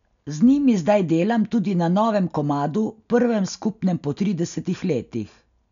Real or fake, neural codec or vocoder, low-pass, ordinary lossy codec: real; none; 7.2 kHz; none